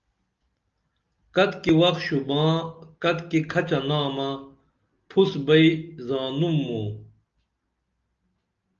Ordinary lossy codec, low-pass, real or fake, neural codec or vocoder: Opus, 24 kbps; 7.2 kHz; real; none